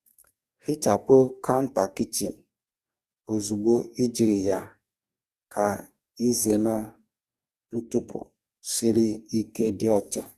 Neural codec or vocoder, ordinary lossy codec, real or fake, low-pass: codec, 44.1 kHz, 2.6 kbps, DAC; Opus, 64 kbps; fake; 14.4 kHz